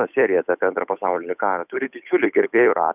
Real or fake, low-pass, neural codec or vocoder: fake; 3.6 kHz; codec, 16 kHz, 8 kbps, FunCodec, trained on Chinese and English, 25 frames a second